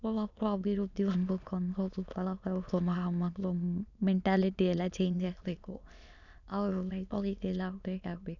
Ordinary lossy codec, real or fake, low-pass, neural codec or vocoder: none; fake; 7.2 kHz; autoencoder, 22.05 kHz, a latent of 192 numbers a frame, VITS, trained on many speakers